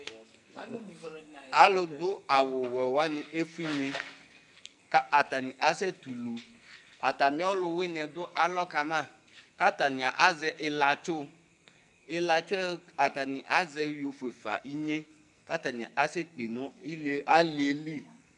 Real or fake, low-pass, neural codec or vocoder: fake; 10.8 kHz; codec, 44.1 kHz, 2.6 kbps, SNAC